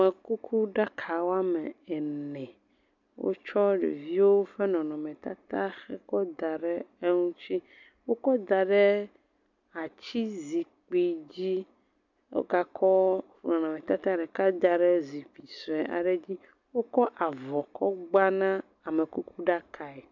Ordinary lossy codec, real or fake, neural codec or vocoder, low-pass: MP3, 64 kbps; real; none; 7.2 kHz